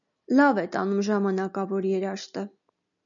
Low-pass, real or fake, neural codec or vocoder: 7.2 kHz; real; none